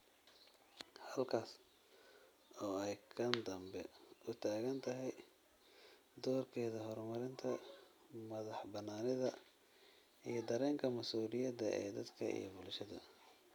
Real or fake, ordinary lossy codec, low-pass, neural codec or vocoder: real; none; none; none